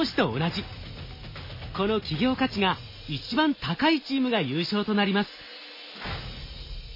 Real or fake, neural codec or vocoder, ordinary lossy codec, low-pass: real; none; MP3, 24 kbps; 5.4 kHz